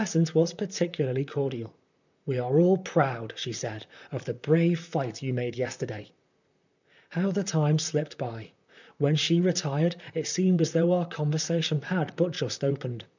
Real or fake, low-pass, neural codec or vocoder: fake; 7.2 kHz; vocoder, 44.1 kHz, 128 mel bands, Pupu-Vocoder